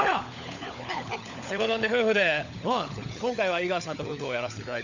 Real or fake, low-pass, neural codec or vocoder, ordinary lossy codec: fake; 7.2 kHz; codec, 16 kHz, 16 kbps, FunCodec, trained on LibriTTS, 50 frames a second; none